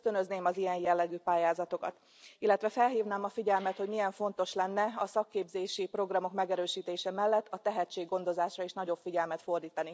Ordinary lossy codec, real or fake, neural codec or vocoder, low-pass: none; real; none; none